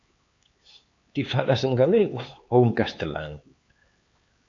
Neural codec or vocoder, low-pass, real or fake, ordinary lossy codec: codec, 16 kHz, 4 kbps, X-Codec, HuBERT features, trained on LibriSpeech; 7.2 kHz; fake; AAC, 48 kbps